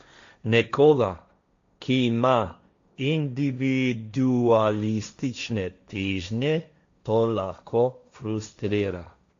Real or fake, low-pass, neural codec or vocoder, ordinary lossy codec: fake; 7.2 kHz; codec, 16 kHz, 1.1 kbps, Voila-Tokenizer; AAC, 64 kbps